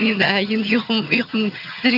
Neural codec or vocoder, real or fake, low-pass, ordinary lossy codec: vocoder, 22.05 kHz, 80 mel bands, HiFi-GAN; fake; 5.4 kHz; none